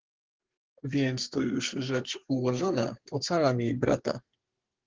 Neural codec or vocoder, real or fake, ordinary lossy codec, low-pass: codec, 32 kHz, 1.9 kbps, SNAC; fake; Opus, 16 kbps; 7.2 kHz